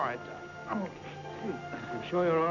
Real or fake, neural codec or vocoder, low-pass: real; none; 7.2 kHz